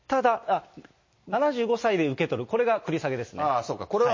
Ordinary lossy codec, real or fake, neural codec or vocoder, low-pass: MP3, 32 kbps; real; none; 7.2 kHz